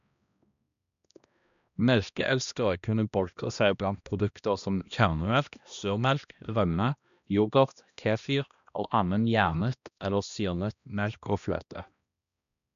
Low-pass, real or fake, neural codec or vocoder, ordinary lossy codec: 7.2 kHz; fake; codec, 16 kHz, 1 kbps, X-Codec, HuBERT features, trained on balanced general audio; none